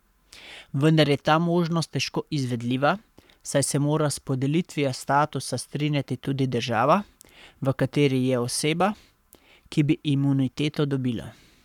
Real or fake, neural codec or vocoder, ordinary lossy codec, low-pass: fake; codec, 44.1 kHz, 7.8 kbps, Pupu-Codec; none; 19.8 kHz